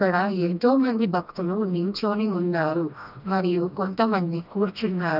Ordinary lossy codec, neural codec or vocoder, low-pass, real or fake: none; codec, 16 kHz, 1 kbps, FreqCodec, smaller model; 5.4 kHz; fake